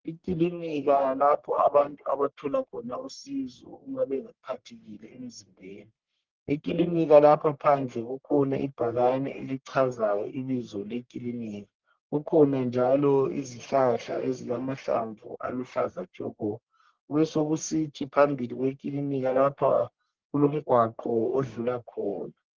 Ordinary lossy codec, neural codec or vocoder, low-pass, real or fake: Opus, 16 kbps; codec, 44.1 kHz, 1.7 kbps, Pupu-Codec; 7.2 kHz; fake